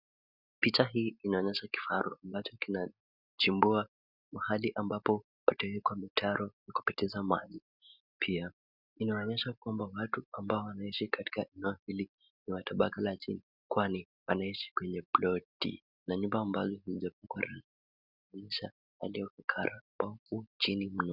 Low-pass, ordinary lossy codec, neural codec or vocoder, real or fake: 5.4 kHz; Opus, 64 kbps; none; real